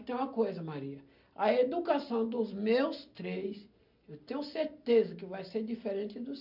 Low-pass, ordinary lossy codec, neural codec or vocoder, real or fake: 5.4 kHz; none; none; real